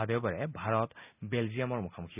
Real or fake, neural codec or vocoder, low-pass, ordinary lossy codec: real; none; 3.6 kHz; none